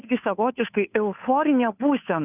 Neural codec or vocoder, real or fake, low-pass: codec, 16 kHz, 2 kbps, FunCodec, trained on Chinese and English, 25 frames a second; fake; 3.6 kHz